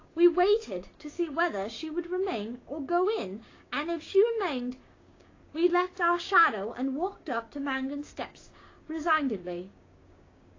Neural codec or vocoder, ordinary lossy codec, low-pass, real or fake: codec, 16 kHz, 6 kbps, DAC; AAC, 32 kbps; 7.2 kHz; fake